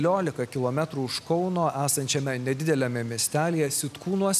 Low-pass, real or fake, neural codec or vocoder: 14.4 kHz; real; none